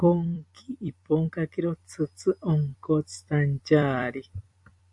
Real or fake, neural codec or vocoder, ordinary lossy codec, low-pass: fake; vocoder, 44.1 kHz, 128 mel bands every 256 samples, BigVGAN v2; AAC, 64 kbps; 10.8 kHz